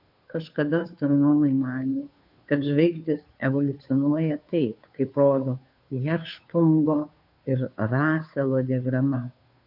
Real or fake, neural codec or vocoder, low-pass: fake; codec, 16 kHz, 2 kbps, FunCodec, trained on Chinese and English, 25 frames a second; 5.4 kHz